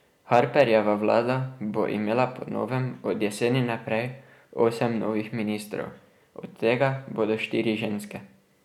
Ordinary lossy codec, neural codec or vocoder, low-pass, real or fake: none; none; 19.8 kHz; real